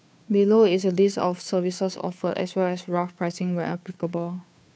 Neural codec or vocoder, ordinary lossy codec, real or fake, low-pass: codec, 16 kHz, 2 kbps, FunCodec, trained on Chinese and English, 25 frames a second; none; fake; none